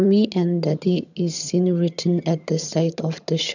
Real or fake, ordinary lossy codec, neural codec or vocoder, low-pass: fake; none; vocoder, 22.05 kHz, 80 mel bands, HiFi-GAN; 7.2 kHz